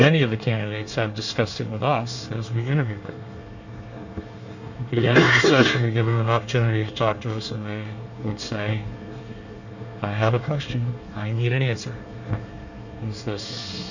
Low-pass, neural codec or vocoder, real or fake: 7.2 kHz; codec, 24 kHz, 1 kbps, SNAC; fake